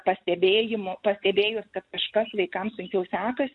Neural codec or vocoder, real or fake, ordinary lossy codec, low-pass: none; real; MP3, 64 kbps; 10.8 kHz